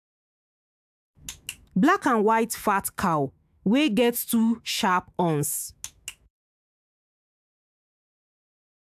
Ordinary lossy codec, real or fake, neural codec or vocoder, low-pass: AAC, 96 kbps; fake; autoencoder, 48 kHz, 128 numbers a frame, DAC-VAE, trained on Japanese speech; 14.4 kHz